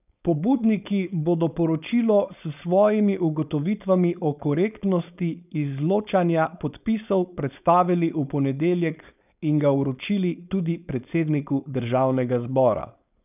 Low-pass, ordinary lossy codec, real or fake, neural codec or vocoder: 3.6 kHz; none; fake; codec, 16 kHz, 4.8 kbps, FACodec